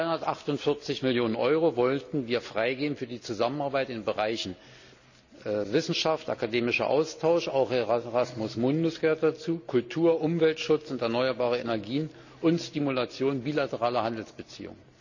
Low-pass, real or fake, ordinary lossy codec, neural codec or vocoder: 7.2 kHz; real; none; none